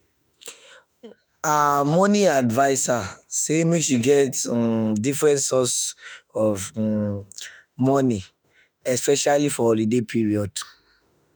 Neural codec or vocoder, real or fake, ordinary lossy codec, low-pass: autoencoder, 48 kHz, 32 numbers a frame, DAC-VAE, trained on Japanese speech; fake; none; none